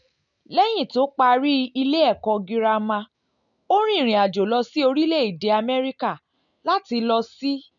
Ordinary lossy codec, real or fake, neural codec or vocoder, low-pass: none; real; none; 7.2 kHz